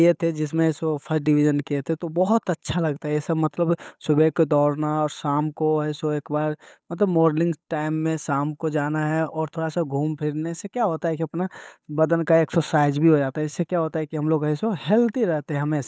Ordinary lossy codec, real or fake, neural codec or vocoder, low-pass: none; fake; codec, 16 kHz, 8 kbps, FunCodec, trained on Chinese and English, 25 frames a second; none